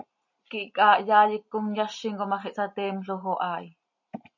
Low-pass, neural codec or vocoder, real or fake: 7.2 kHz; none; real